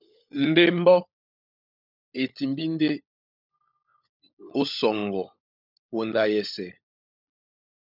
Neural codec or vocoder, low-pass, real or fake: codec, 16 kHz, 16 kbps, FunCodec, trained on LibriTTS, 50 frames a second; 5.4 kHz; fake